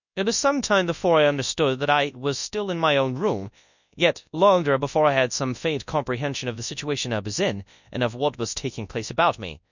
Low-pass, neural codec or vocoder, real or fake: 7.2 kHz; codec, 24 kHz, 0.9 kbps, WavTokenizer, large speech release; fake